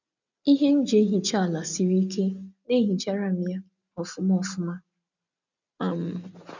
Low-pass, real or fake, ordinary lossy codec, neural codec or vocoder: 7.2 kHz; real; none; none